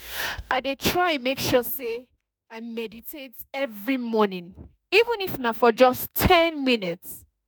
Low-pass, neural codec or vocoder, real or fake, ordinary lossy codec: none; autoencoder, 48 kHz, 32 numbers a frame, DAC-VAE, trained on Japanese speech; fake; none